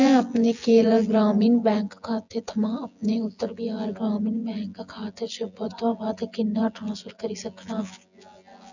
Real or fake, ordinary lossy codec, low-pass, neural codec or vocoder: fake; none; 7.2 kHz; vocoder, 24 kHz, 100 mel bands, Vocos